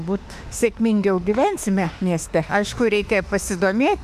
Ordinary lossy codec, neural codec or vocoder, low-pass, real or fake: AAC, 96 kbps; autoencoder, 48 kHz, 32 numbers a frame, DAC-VAE, trained on Japanese speech; 14.4 kHz; fake